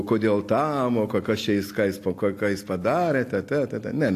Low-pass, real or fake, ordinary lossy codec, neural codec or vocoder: 14.4 kHz; real; AAC, 64 kbps; none